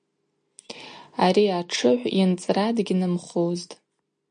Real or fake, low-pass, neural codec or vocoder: real; 9.9 kHz; none